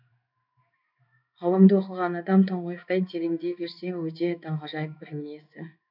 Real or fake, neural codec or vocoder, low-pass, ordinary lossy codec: fake; codec, 16 kHz in and 24 kHz out, 1 kbps, XY-Tokenizer; 5.4 kHz; none